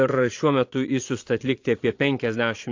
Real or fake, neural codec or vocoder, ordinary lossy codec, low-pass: fake; vocoder, 22.05 kHz, 80 mel bands, Vocos; AAC, 48 kbps; 7.2 kHz